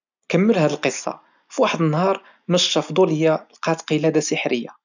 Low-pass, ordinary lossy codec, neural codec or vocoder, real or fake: 7.2 kHz; none; none; real